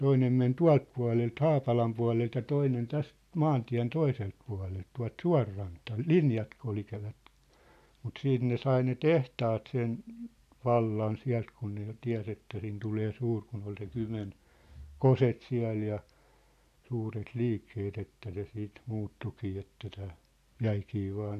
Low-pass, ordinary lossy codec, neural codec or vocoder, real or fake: 14.4 kHz; none; none; real